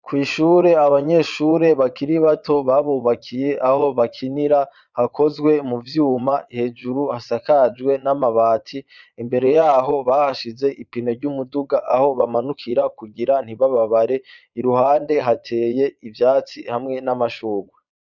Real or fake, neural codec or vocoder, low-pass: fake; vocoder, 22.05 kHz, 80 mel bands, Vocos; 7.2 kHz